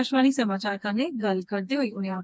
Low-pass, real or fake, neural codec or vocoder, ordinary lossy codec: none; fake; codec, 16 kHz, 2 kbps, FreqCodec, smaller model; none